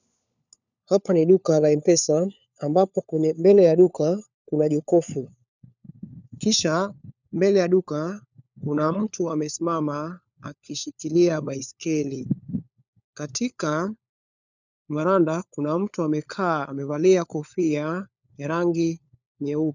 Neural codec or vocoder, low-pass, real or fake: codec, 16 kHz, 4 kbps, FunCodec, trained on LibriTTS, 50 frames a second; 7.2 kHz; fake